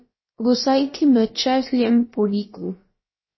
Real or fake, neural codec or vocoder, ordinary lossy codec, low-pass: fake; codec, 16 kHz, about 1 kbps, DyCAST, with the encoder's durations; MP3, 24 kbps; 7.2 kHz